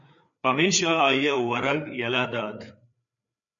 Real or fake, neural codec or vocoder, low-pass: fake; codec, 16 kHz, 4 kbps, FreqCodec, larger model; 7.2 kHz